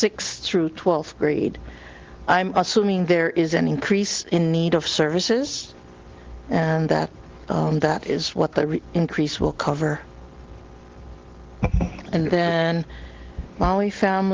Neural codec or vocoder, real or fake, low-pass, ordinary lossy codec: none; real; 7.2 kHz; Opus, 16 kbps